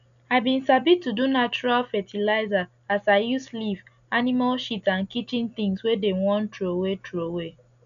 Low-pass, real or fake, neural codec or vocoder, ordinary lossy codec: 7.2 kHz; real; none; none